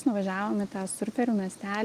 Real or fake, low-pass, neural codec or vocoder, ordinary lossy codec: real; 14.4 kHz; none; Opus, 24 kbps